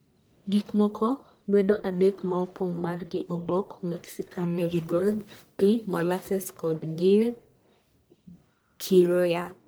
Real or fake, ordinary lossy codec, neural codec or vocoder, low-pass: fake; none; codec, 44.1 kHz, 1.7 kbps, Pupu-Codec; none